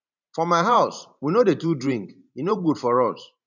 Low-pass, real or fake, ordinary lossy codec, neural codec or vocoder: 7.2 kHz; fake; none; vocoder, 44.1 kHz, 128 mel bands every 512 samples, BigVGAN v2